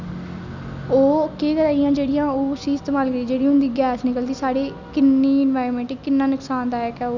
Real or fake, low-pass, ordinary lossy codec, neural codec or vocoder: real; 7.2 kHz; none; none